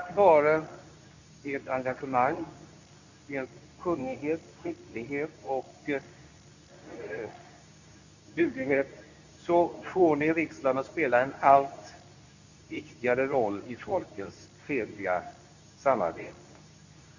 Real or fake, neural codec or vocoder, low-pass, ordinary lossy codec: fake; codec, 24 kHz, 0.9 kbps, WavTokenizer, medium speech release version 2; 7.2 kHz; none